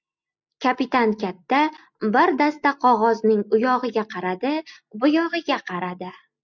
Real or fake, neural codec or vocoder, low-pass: real; none; 7.2 kHz